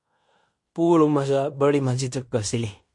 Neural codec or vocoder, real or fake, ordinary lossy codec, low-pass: codec, 16 kHz in and 24 kHz out, 0.9 kbps, LongCat-Audio-Codec, fine tuned four codebook decoder; fake; MP3, 48 kbps; 10.8 kHz